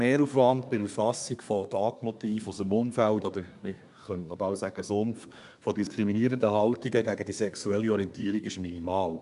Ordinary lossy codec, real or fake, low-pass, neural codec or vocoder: none; fake; 10.8 kHz; codec, 24 kHz, 1 kbps, SNAC